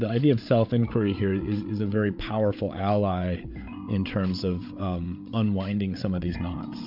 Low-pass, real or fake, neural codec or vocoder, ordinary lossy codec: 5.4 kHz; fake; codec, 16 kHz, 8 kbps, FreqCodec, larger model; MP3, 48 kbps